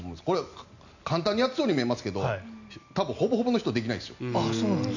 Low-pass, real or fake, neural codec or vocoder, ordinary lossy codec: 7.2 kHz; real; none; none